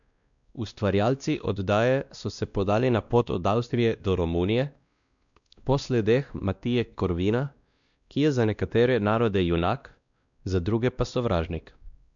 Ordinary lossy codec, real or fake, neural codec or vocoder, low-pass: none; fake; codec, 16 kHz, 1 kbps, X-Codec, WavLM features, trained on Multilingual LibriSpeech; 7.2 kHz